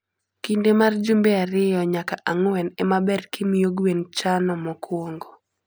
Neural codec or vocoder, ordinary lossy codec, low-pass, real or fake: none; none; none; real